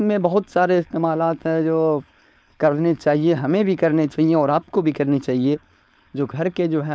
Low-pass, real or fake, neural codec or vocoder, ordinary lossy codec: none; fake; codec, 16 kHz, 4.8 kbps, FACodec; none